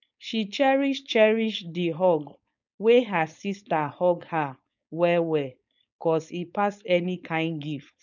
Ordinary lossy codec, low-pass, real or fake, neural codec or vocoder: none; 7.2 kHz; fake; codec, 16 kHz, 4.8 kbps, FACodec